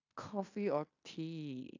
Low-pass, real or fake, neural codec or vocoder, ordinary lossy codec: 7.2 kHz; fake; codec, 16 kHz in and 24 kHz out, 0.9 kbps, LongCat-Audio-Codec, fine tuned four codebook decoder; AAC, 48 kbps